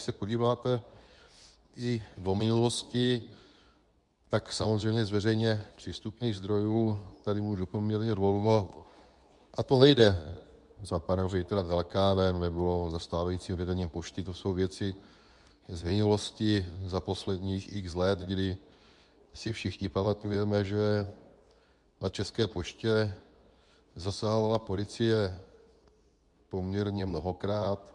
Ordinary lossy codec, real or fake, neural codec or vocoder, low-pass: MP3, 96 kbps; fake; codec, 24 kHz, 0.9 kbps, WavTokenizer, medium speech release version 2; 10.8 kHz